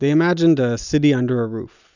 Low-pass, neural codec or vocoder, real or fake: 7.2 kHz; none; real